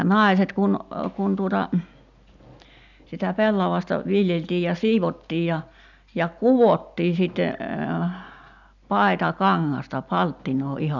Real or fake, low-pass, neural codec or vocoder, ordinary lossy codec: real; 7.2 kHz; none; none